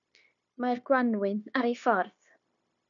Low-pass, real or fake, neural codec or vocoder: 7.2 kHz; fake; codec, 16 kHz, 0.9 kbps, LongCat-Audio-Codec